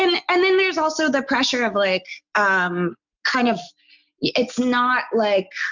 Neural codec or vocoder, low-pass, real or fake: none; 7.2 kHz; real